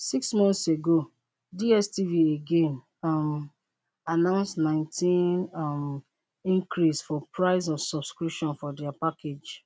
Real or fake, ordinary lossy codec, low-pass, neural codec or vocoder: real; none; none; none